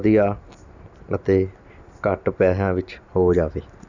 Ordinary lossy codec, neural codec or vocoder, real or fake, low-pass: none; none; real; 7.2 kHz